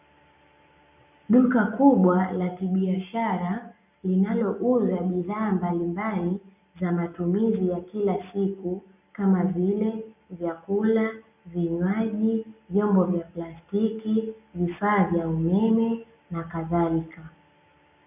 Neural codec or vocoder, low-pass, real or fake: none; 3.6 kHz; real